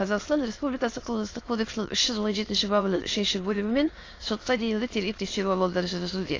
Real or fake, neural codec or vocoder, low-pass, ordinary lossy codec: fake; autoencoder, 22.05 kHz, a latent of 192 numbers a frame, VITS, trained on many speakers; 7.2 kHz; AAC, 32 kbps